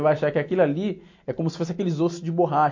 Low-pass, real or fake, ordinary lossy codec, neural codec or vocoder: 7.2 kHz; real; MP3, 48 kbps; none